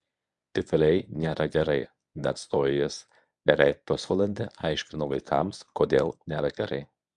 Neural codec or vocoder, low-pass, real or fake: codec, 24 kHz, 0.9 kbps, WavTokenizer, medium speech release version 1; 10.8 kHz; fake